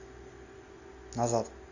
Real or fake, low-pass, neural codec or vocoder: real; 7.2 kHz; none